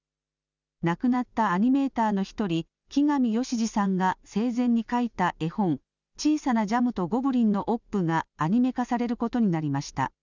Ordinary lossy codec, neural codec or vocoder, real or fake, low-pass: none; none; real; 7.2 kHz